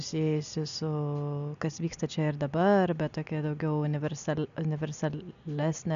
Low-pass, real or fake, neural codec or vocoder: 7.2 kHz; real; none